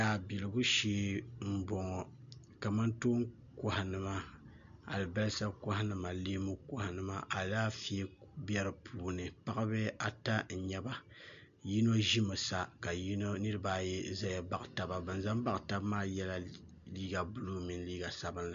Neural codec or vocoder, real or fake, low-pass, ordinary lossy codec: none; real; 7.2 kHz; MP3, 64 kbps